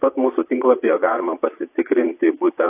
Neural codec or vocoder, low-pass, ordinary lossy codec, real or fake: vocoder, 22.05 kHz, 80 mel bands, Vocos; 3.6 kHz; AAC, 32 kbps; fake